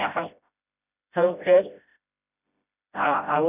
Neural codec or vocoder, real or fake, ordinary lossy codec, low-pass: codec, 16 kHz, 0.5 kbps, FreqCodec, smaller model; fake; none; 3.6 kHz